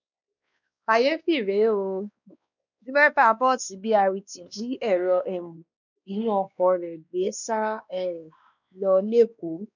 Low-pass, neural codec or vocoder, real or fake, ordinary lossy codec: 7.2 kHz; codec, 16 kHz, 2 kbps, X-Codec, WavLM features, trained on Multilingual LibriSpeech; fake; none